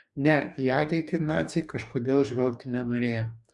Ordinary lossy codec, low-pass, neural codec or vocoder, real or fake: Opus, 64 kbps; 10.8 kHz; codec, 44.1 kHz, 2.6 kbps, DAC; fake